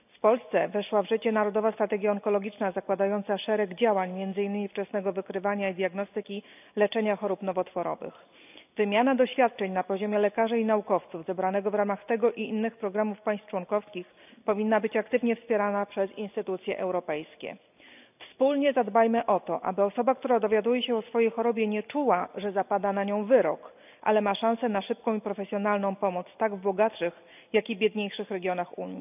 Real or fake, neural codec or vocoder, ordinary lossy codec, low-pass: real; none; none; 3.6 kHz